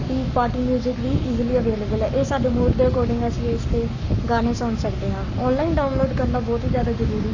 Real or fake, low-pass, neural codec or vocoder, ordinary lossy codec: fake; 7.2 kHz; codec, 44.1 kHz, 7.8 kbps, Pupu-Codec; none